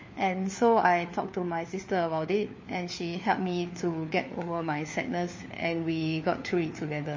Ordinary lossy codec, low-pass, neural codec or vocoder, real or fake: MP3, 32 kbps; 7.2 kHz; codec, 16 kHz, 4 kbps, FunCodec, trained on LibriTTS, 50 frames a second; fake